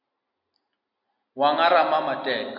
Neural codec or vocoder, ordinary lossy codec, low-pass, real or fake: none; MP3, 48 kbps; 5.4 kHz; real